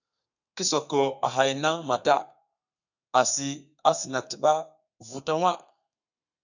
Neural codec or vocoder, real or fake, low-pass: codec, 32 kHz, 1.9 kbps, SNAC; fake; 7.2 kHz